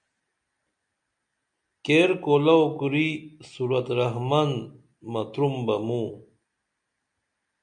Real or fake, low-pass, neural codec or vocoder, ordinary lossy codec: real; 9.9 kHz; none; MP3, 64 kbps